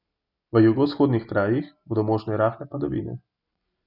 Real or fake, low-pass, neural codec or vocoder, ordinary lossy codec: real; 5.4 kHz; none; none